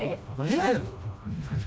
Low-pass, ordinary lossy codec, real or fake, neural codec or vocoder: none; none; fake; codec, 16 kHz, 1 kbps, FreqCodec, smaller model